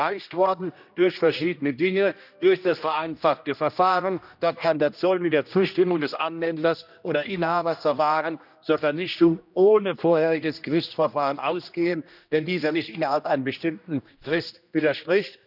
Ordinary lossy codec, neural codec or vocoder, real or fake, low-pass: none; codec, 16 kHz, 1 kbps, X-Codec, HuBERT features, trained on general audio; fake; 5.4 kHz